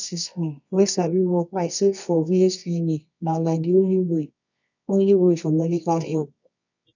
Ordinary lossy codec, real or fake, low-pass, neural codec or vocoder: none; fake; 7.2 kHz; codec, 24 kHz, 0.9 kbps, WavTokenizer, medium music audio release